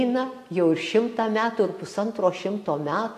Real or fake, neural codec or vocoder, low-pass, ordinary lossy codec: real; none; 14.4 kHz; AAC, 64 kbps